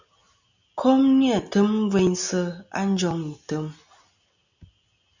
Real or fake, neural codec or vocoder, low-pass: real; none; 7.2 kHz